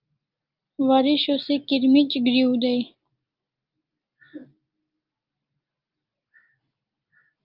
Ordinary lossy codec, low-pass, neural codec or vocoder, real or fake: Opus, 24 kbps; 5.4 kHz; none; real